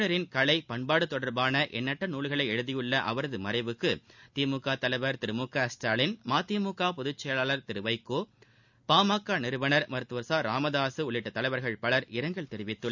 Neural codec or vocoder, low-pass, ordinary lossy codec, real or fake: none; 7.2 kHz; none; real